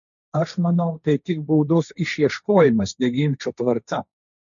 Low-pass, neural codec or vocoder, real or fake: 7.2 kHz; codec, 16 kHz, 1.1 kbps, Voila-Tokenizer; fake